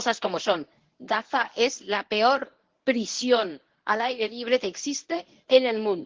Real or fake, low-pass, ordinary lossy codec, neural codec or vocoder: fake; 7.2 kHz; Opus, 16 kbps; codec, 24 kHz, 0.9 kbps, WavTokenizer, medium speech release version 1